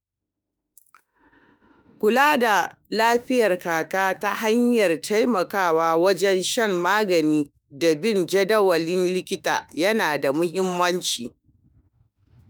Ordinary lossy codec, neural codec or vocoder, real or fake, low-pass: none; autoencoder, 48 kHz, 32 numbers a frame, DAC-VAE, trained on Japanese speech; fake; none